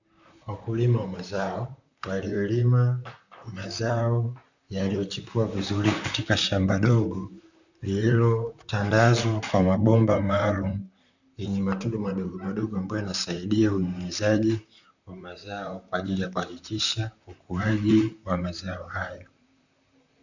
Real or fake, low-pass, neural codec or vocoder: fake; 7.2 kHz; vocoder, 44.1 kHz, 128 mel bands, Pupu-Vocoder